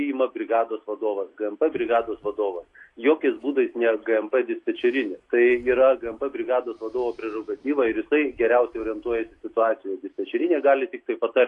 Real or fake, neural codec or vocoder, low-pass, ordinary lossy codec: real; none; 10.8 kHz; AAC, 48 kbps